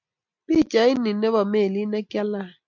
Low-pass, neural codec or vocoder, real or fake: 7.2 kHz; none; real